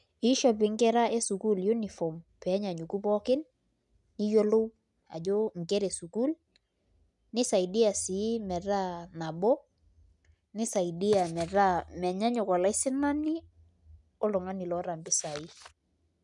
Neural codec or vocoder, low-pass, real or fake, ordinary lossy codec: none; 10.8 kHz; real; none